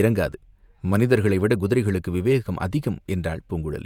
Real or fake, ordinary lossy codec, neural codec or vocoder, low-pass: fake; none; vocoder, 48 kHz, 128 mel bands, Vocos; 19.8 kHz